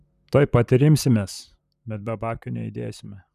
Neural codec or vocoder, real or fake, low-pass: vocoder, 44.1 kHz, 128 mel bands, Pupu-Vocoder; fake; 14.4 kHz